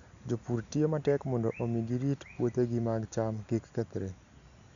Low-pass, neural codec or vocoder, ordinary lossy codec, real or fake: 7.2 kHz; none; none; real